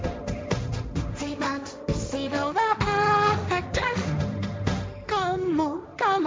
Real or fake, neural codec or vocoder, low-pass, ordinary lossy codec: fake; codec, 16 kHz, 1.1 kbps, Voila-Tokenizer; none; none